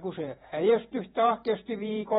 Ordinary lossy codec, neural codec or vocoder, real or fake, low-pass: AAC, 16 kbps; vocoder, 48 kHz, 128 mel bands, Vocos; fake; 19.8 kHz